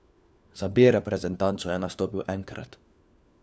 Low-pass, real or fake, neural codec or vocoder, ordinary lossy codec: none; fake; codec, 16 kHz, 4 kbps, FunCodec, trained on LibriTTS, 50 frames a second; none